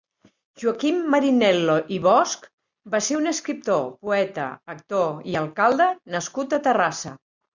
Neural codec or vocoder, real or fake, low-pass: none; real; 7.2 kHz